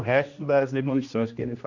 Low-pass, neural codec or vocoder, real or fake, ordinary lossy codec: 7.2 kHz; codec, 16 kHz, 1 kbps, X-Codec, HuBERT features, trained on general audio; fake; none